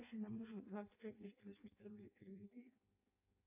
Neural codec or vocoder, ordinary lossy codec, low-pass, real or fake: codec, 16 kHz in and 24 kHz out, 0.6 kbps, FireRedTTS-2 codec; AAC, 24 kbps; 3.6 kHz; fake